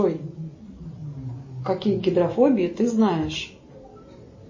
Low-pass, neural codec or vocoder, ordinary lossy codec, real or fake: 7.2 kHz; none; MP3, 32 kbps; real